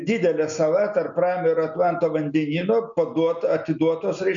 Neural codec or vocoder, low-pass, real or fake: none; 7.2 kHz; real